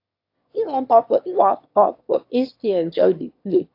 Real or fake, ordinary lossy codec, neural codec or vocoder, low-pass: fake; AAC, 32 kbps; autoencoder, 22.05 kHz, a latent of 192 numbers a frame, VITS, trained on one speaker; 5.4 kHz